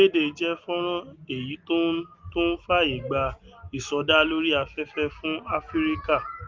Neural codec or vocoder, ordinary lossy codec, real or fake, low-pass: none; Opus, 32 kbps; real; 7.2 kHz